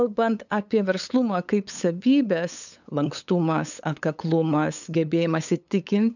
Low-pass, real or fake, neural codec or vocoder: 7.2 kHz; fake; codec, 16 kHz, 8 kbps, FunCodec, trained on LibriTTS, 25 frames a second